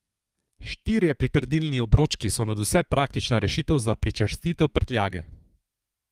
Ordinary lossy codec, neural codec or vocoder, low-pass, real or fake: Opus, 32 kbps; codec, 32 kHz, 1.9 kbps, SNAC; 14.4 kHz; fake